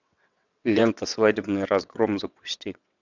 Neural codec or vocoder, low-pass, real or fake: vocoder, 22.05 kHz, 80 mel bands, WaveNeXt; 7.2 kHz; fake